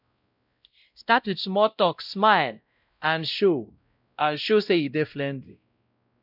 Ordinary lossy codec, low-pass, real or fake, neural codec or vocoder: none; 5.4 kHz; fake; codec, 16 kHz, 0.5 kbps, X-Codec, WavLM features, trained on Multilingual LibriSpeech